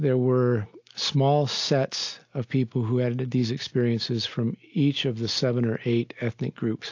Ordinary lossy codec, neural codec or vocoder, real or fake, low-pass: AAC, 48 kbps; none; real; 7.2 kHz